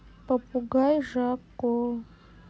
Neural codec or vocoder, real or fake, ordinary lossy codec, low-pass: none; real; none; none